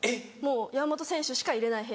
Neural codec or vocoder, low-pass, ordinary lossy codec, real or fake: none; none; none; real